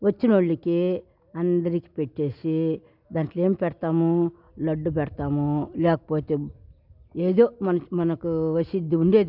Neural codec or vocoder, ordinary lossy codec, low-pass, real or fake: none; none; 5.4 kHz; real